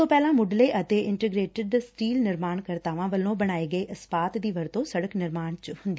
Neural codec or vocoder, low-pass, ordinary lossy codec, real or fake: none; none; none; real